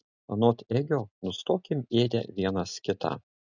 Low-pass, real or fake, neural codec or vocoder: 7.2 kHz; real; none